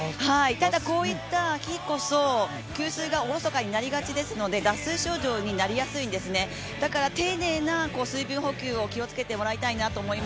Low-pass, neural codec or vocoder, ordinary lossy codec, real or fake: none; none; none; real